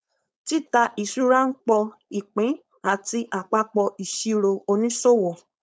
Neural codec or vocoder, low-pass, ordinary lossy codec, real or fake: codec, 16 kHz, 4.8 kbps, FACodec; none; none; fake